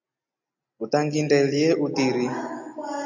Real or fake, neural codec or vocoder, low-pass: real; none; 7.2 kHz